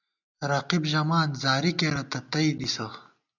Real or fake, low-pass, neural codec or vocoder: real; 7.2 kHz; none